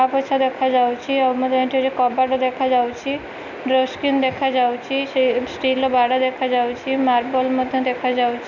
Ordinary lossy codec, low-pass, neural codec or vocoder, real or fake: none; 7.2 kHz; none; real